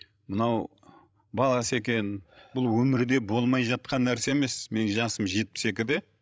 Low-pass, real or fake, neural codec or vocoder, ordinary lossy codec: none; fake; codec, 16 kHz, 16 kbps, FreqCodec, larger model; none